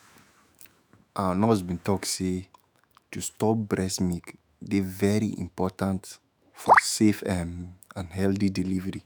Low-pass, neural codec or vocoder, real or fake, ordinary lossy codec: none; autoencoder, 48 kHz, 128 numbers a frame, DAC-VAE, trained on Japanese speech; fake; none